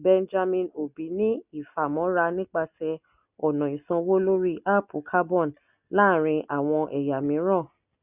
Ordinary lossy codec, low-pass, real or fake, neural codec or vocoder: none; 3.6 kHz; real; none